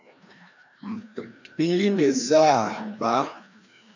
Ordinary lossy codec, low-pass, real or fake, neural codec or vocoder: AAC, 48 kbps; 7.2 kHz; fake; codec, 16 kHz, 1 kbps, FreqCodec, larger model